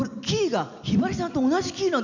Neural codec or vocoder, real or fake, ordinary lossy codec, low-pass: none; real; none; 7.2 kHz